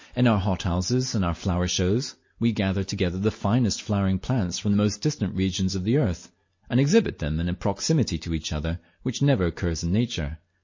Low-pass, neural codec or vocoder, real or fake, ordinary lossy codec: 7.2 kHz; none; real; MP3, 32 kbps